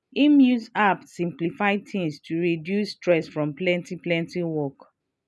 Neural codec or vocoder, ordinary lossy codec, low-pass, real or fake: none; none; none; real